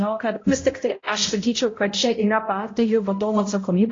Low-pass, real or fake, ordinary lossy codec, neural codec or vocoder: 7.2 kHz; fake; AAC, 32 kbps; codec, 16 kHz, 0.5 kbps, X-Codec, HuBERT features, trained on balanced general audio